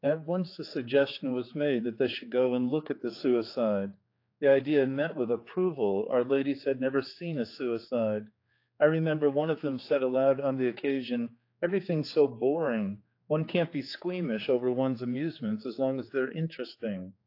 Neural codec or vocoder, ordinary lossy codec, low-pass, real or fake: codec, 16 kHz, 4 kbps, X-Codec, HuBERT features, trained on general audio; AAC, 32 kbps; 5.4 kHz; fake